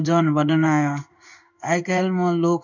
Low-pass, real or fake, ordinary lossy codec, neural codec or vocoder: 7.2 kHz; fake; none; codec, 16 kHz in and 24 kHz out, 1 kbps, XY-Tokenizer